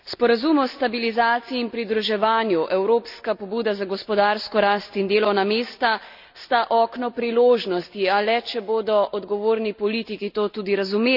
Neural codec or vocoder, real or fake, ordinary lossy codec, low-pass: none; real; none; 5.4 kHz